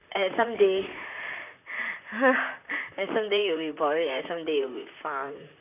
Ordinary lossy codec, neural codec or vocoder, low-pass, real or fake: none; vocoder, 44.1 kHz, 128 mel bands, Pupu-Vocoder; 3.6 kHz; fake